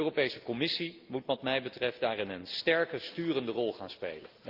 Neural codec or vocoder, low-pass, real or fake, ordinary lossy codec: none; 5.4 kHz; real; Opus, 32 kbps